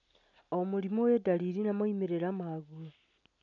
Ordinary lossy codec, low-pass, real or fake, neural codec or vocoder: none; 7.2 kHz; real; none